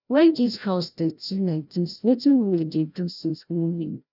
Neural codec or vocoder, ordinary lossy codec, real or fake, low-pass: codec, 16 kHz, 0.5 kbps, FreqCodec, larger model; none; fake; 5.4 kHz